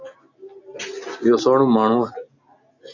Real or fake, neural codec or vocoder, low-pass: real; none; 7.2 kHz